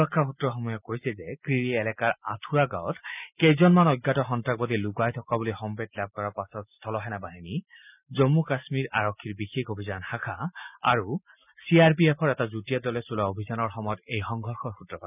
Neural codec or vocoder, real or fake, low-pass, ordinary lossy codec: none; real; 3.6 kHz; none